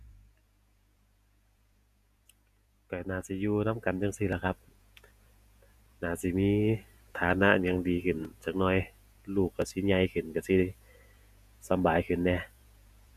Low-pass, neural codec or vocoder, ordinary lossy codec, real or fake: 14.4 kHz; vocoder, 48 kHz, 128 mel bands, Vocos; none; fake